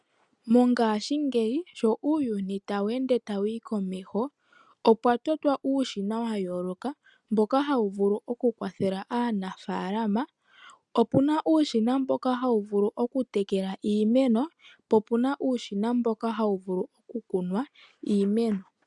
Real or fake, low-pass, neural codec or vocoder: real; 10.8 kHz; none